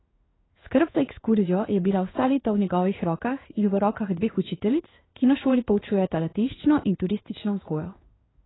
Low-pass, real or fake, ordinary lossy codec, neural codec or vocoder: 7.2 kHz; fake; AAC, 16 kbps; codec, 16 kHz in and 24 kHz out, 1 kbps, XY-Tokenizer